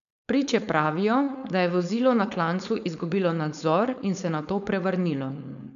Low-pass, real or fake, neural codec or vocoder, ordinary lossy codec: 7.2 kHz; fake; codec, 16 kHz, 4.8 kbps, FACodec; none